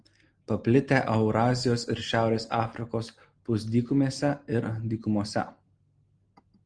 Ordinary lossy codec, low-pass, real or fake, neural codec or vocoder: Opus, 24 kbps; 9.9 kHz; real; none